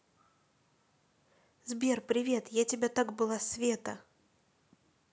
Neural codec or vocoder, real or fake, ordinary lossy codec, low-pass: none; real; none; none